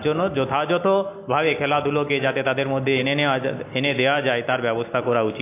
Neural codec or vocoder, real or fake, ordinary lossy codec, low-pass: none; real; AAC, 24 kbps; 3.6 kHz